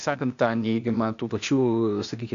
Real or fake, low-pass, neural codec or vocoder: fake; 7.2 kHz; codec, 16 kHz, 0.8 kbps, ZipCodec